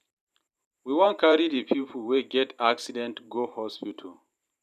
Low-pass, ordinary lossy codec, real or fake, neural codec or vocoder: 14.4 kHz; none; fake; vocoder, 44.1 kHz, 128 mel bands every 512 samples, BigVGAN v2